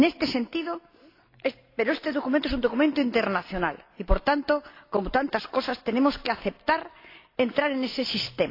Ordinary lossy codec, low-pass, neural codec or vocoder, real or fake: AAC, 32 kbps; 5.4 kHz; none; real